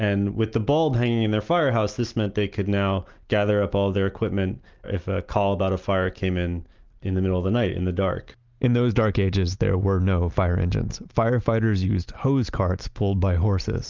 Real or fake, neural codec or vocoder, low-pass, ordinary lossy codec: real; none; 7.2 kHz; Opus, 24 kbps